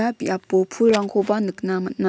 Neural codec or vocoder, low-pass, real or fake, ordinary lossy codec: none; none; real; none